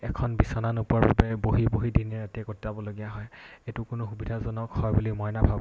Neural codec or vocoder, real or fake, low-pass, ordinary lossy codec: none; real; none; none